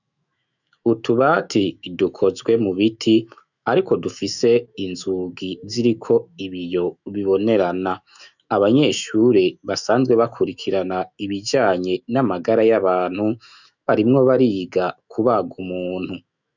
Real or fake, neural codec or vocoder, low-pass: fake; autoencoder, 48 kHz, 128 numbers a frame, DAC-VAE, trained on Japanese speech; 7.2 kHz